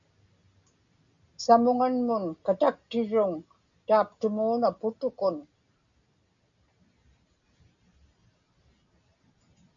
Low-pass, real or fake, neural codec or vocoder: 7.2 kHz; real; none